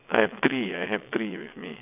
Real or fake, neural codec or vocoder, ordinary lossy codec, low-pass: fake; vocoder, 22.05 kHz, 80 mel bands, WaveNeXt; none; 3.6 kHz